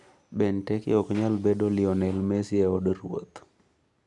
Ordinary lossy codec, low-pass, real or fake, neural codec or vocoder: none; 10.8 kHz; real; none